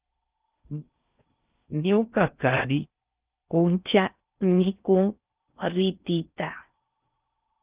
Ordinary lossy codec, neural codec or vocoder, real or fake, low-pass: Opus, 24 kbps; codec, 16 kHz in and 24 kHz out, 0.6 kbps, FocalCodec, streaming, 4096 codes; fake; 3.6 kHz